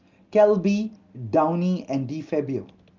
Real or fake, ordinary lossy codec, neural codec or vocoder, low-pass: real; Opus, 32 kbps; none; 7.2 kHz